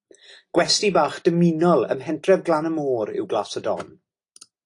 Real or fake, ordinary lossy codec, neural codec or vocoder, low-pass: real; AAC, 48 kbps; none; 10.8 kHz